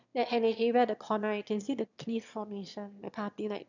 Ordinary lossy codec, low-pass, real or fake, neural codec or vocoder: none; 7.2 kHz; fake; autoencoder, 22.05 kHz, a latent of 192 numbers a frame, VITS, trained on one speaker